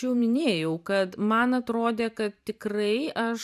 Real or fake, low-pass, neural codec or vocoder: fake; 14.4 kHz; vocoder, 44.1 kHz, 128 mel bands every 512 samples, BigVGAN v2